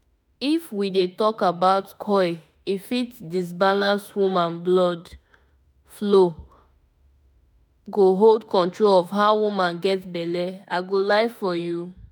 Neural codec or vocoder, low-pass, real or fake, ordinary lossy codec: autoencoder, 48 kHz, 32 numbers a frame, DAC-VAE, trained on Japanese speech; none; fake; none